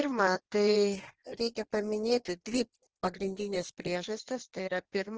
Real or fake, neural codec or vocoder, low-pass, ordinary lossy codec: fake; codec, 16 kHz in and 24 kHz out, 1.1 kbps, FireRedTTS-2 codec; 7.2 kHz; Opus, 16 kbps